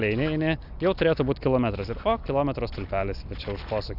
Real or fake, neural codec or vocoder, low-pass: real; none; 5.4 kHz